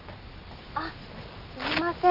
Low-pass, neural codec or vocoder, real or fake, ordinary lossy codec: 5.4 kHz; none; real; Opus, 64 kbps